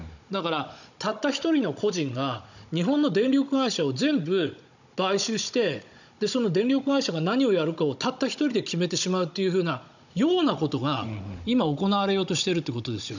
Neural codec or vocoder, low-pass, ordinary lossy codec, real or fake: codec, 16 kHz, 16 kbps, FunCodec, trained on Chinese and English, 50 frames a second; 7.2 kHz; none; fake